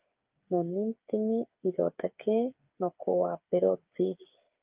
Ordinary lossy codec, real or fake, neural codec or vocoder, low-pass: Opus, 24 kbps; fake; codec, 16 kHz, 8 kbps, FreqCodec, smaller model; 3.6 kHz